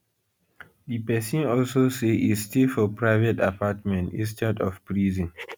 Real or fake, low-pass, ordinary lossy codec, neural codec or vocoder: real; none; none; none